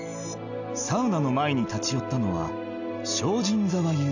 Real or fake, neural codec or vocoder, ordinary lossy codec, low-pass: real; none; none; 7.2 kHz